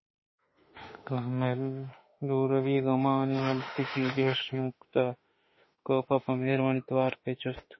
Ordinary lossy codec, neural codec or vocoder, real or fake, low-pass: MP3, 24 kbps; autoencoder, 48 kHz, 32 numbers a frame, DAC-VAE, trained on Japanese speech; fake; 7.2 kHz